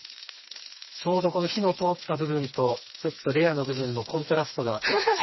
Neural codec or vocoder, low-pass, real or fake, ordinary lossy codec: codec, 16 kHz, 2 kbps, FreqCodec, smaller model; 7.2 kHz; fake; MP3, 24 kbps